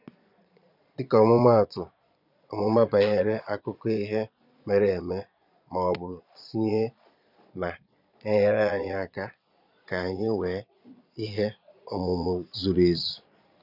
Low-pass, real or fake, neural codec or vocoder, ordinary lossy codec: 5.4 kHz; fake; vocoder, 22.05 kHz, 80 mel bands, Vocos; none